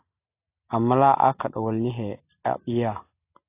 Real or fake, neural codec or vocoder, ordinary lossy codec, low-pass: real; none; AAC, 24 kbps; 3.6 kHz